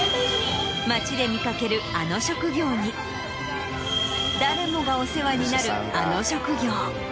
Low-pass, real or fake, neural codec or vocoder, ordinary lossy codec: none; real; none; none